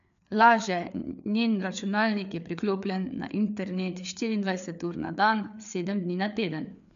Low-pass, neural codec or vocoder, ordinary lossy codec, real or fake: 7.2 kHz; codec, 16 kHz, 4 kbps, FreqCodec, larger model; MP3, 96 kbps; fake